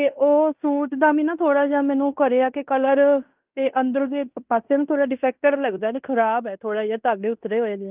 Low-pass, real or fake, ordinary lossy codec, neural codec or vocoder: 3.6 kHz; fake; Opus, 24 kbps; codec, 16 kHz in and 24 kHz out, 0.9 kbps, LongCat-Audio-Codec, fine tuned four codebook decoder